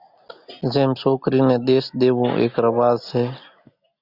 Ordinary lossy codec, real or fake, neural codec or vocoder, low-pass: Opus, 64 kbps; real; none; 5.4 kHz